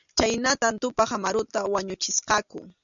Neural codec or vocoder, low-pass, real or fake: none; 7.2 kHz; real